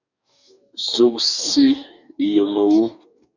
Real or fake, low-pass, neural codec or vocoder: fake; 7.2 kHz; codec, 44.1 kHz, 2.6 kbps, DAC